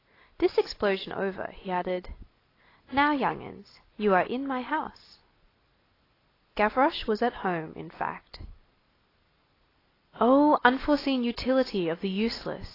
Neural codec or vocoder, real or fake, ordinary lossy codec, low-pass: none; real; AAC, 24 kbps; 5.4 kHz